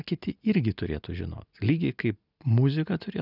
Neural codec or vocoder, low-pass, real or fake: none; 5.4 kHz; real